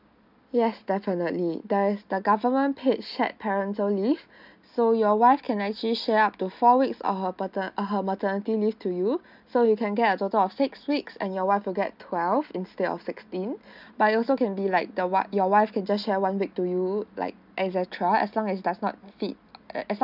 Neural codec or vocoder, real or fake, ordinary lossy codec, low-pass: none; real; none; 5.4 kHz